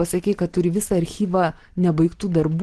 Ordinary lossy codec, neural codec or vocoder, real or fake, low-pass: Opus, 16 kbps; none; real; 10.8 kHz